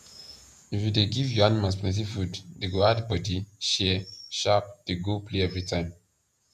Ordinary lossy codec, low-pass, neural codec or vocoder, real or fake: none; 14.4 kHz; vocoder, 48 kHz, 128 mel bands, Vocos; fake